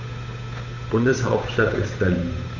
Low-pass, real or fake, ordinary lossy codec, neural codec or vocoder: 7.2 kHz; fake; none; codec, 16 kHz, 8 kbps, FunCodec, trained on Chinese and English, 25 frames a second